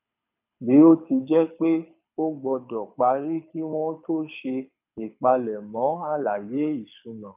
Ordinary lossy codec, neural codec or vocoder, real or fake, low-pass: none; codec, 24 kHz, 6 kbps, HILCodec; fake; 3.6 kHz